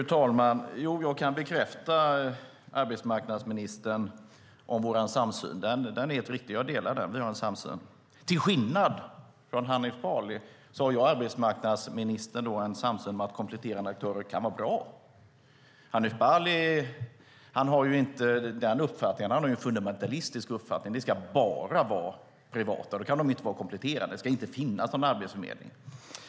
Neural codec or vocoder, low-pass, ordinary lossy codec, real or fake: none; none; none; real